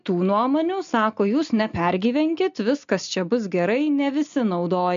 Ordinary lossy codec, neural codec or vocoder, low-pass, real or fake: MP3, 64 kbps; none; 7.2 kHz; real